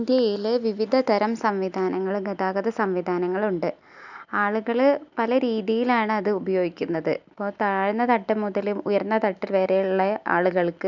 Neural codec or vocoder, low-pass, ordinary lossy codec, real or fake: none; 7.2 kHz; none; real